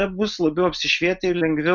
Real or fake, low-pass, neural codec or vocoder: real; 7.2 kHz; none